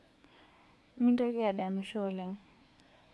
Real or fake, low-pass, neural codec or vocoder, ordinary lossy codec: fake; none; codec, 24 kHz, 1 kbps, SNAC; none